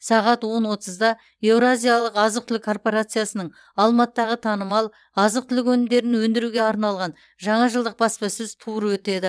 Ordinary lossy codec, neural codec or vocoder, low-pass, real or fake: none; vocoder, 22.05 kHz, 80 mel bands, WaveNeXt; none; fake